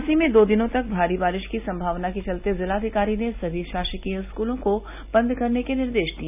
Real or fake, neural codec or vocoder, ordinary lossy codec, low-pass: real; none; none; 3.6 kHz